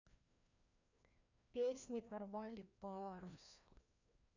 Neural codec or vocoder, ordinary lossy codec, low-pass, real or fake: codec, 16 kHz, 1 kbps, FreqCodec, larger model; none; 7.2 kHz; fake